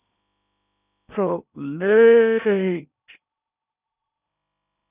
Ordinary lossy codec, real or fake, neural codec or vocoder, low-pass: none; fake; codec, 16 kHz in and 24 kHz out, 0.8 kbps, FocalCodec, streaming, 65536 codes; 3.6 kHz